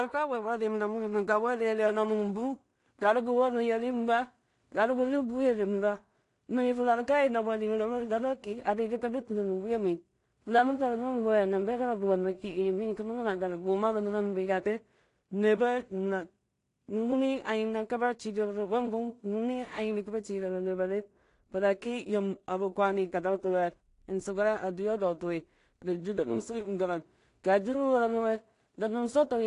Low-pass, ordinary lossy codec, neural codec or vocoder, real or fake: 10.8 kHz; AAC, 48 kbps; codec, 16 kHz in and 24 kHz out, 0.4 kbps, LongCat-Audio-Codec, two codebook decoder; fake